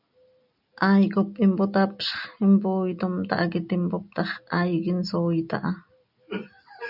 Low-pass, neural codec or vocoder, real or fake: 5.4 kHz; none; real